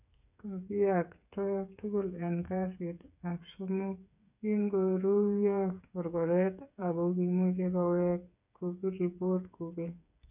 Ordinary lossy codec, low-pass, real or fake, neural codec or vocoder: none; 3.6 kHz; fake; codec, 16 kHz, 8 kbps, FreqCodec, smaller model